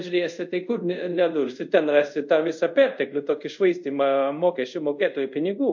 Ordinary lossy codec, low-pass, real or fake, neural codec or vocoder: MP3, 48 kbps; 7.2 kHz; fake; codec, 24 kHz, 0.5 kbps, DualCodec